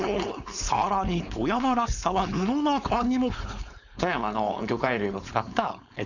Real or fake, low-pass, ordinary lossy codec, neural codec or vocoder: fake; 7.2 kHz; none; codec, 16 kHz, 4.8 kbps, FACodec